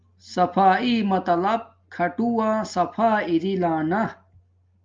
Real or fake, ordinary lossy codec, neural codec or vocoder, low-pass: real; Opus, 24 kbps; none; 7.2 kHz